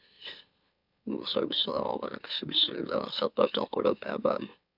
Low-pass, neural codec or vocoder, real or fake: 5.4 kHz; autoencoder, 44.1 kHz, a latent of 192 numbers a frame, MeloTTS; fake